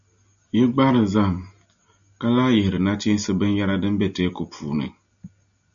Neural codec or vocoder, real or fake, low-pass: none; real; 7.2 kHz